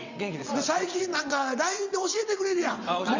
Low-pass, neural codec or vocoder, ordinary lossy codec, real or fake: 7.2 kHz; vocoder, 22.05 kHz, 80 mel bands, WaveNeXt; Opus, 64 kbps; fake